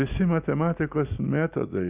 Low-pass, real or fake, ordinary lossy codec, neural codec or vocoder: 3.6 kHz; real; Opus, 64 kbps; none